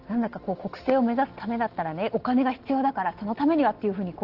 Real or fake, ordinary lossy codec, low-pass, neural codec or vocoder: real; Opus, 16 kbps; 5.4 kHz; none